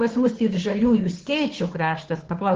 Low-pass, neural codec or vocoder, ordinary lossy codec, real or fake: 7.2 kHz; codec, 16 kHz, 2 kbps, FunCodec, trained on Chinese and English, 25 frames a second; Opus, 16 kbps; fake